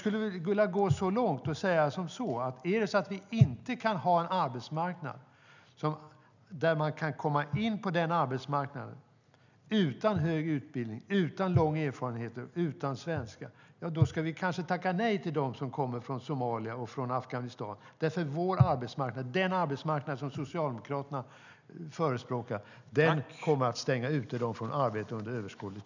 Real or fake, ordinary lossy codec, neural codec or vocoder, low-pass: real; none; none; 7.2 kHz